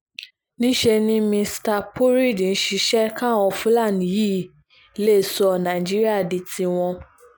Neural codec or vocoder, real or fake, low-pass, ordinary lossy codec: none; real; none; none